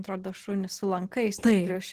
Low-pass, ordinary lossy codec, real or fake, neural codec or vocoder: 14.4 kHz; Opus, 16 kbps; real; none